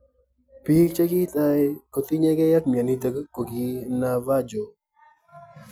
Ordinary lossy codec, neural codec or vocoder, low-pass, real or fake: none; none; none; real